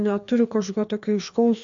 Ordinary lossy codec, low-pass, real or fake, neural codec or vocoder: AAC, 64 kbps; 7.2 kHz; fake; codec, 16 kHz, 2 kbps, FreqCodec, larger model